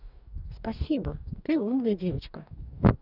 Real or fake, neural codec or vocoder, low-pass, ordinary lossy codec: fake; codec, 44.1 kHz, 2.6 kbps, DAC; 5.4 kHz; none